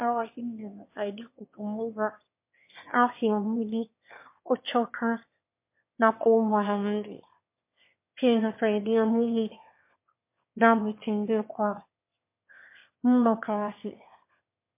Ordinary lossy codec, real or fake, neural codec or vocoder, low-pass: MP3, 24 kbps; fake; autoencoder, 22.05 kHz, a latent of 192 numbers a frame, VITS, trained on one speaker; 3.6 kHz